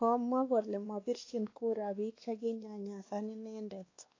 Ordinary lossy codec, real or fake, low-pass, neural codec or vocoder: AAC, 32 kbps; fake; 7.2 kHz; codec, 16 kHz, 2 kbps, X-Codec, WavLM features, trained on Multilingual LibriSpeech